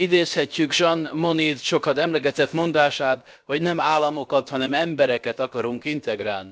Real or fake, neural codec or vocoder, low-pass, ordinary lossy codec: fake; codec, 16 kHz, about 1 kbps, DyCAST, with the encoder's durations; none; none